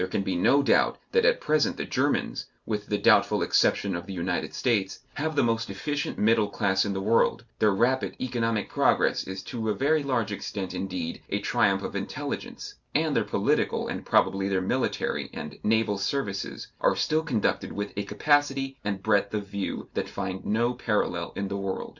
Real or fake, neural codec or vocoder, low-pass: real; none; 7.2 kHz